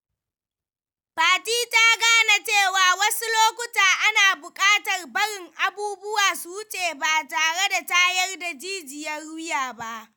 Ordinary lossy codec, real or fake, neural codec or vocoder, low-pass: none; real; none; none